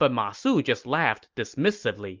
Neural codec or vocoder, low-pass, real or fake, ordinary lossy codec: none; 7.2 kHz; real; Opus, 24 kbps